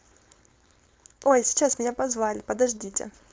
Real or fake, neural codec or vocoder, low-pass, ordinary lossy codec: fake; codec, 16 kHz, 4.8 kbps, FACodec; none; none